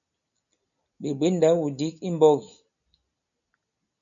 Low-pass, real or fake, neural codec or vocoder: 7.2 kHz; real; none